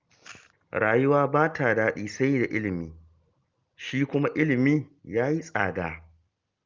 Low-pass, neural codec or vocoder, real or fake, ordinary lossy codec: 7.2 kHz; none; real; Opus, 32 kbps